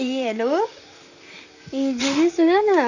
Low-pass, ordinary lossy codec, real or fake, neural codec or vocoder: 7.2 kHz; none; fake; vocoder, 44.1 kHz, 128 mel bands, Pupu-Vocoder